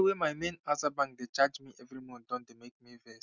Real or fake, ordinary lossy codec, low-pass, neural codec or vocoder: real; none; 7.2 kHz; none